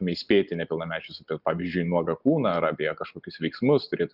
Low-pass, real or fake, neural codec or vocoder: 5.4 kHz; real; none